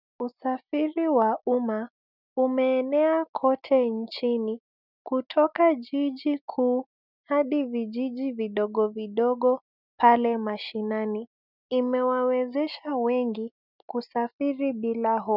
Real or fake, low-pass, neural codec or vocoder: real; 5.4 kHz; none